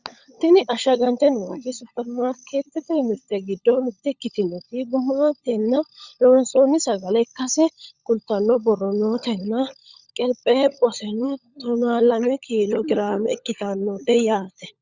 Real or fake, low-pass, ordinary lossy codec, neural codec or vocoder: fake; 7.2 kHz; Opus, 64 kbps; codec, 16 kHz, 16 kbps, FunCodec, trained on LibriTTS, 50 frames a second